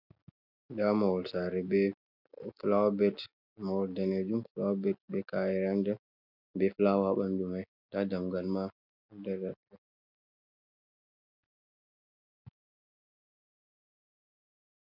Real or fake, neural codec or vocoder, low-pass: real; none; 5.4 kHz